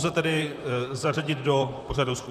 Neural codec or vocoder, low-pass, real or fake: vocoder, 44.1 kHz, 128 mel bands, Pupu-Vocoder; 14.4 kHz; fake